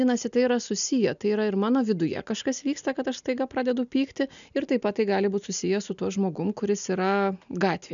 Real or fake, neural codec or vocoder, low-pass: real; none; 7.2 kHz